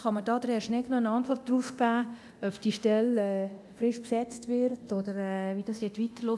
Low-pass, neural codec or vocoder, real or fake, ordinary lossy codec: none; codec, 24 kHz, 0.9 kbps, DualCodec; fake; none